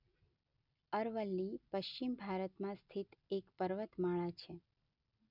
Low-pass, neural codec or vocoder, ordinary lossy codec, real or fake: 5.4 kHz; none; none; real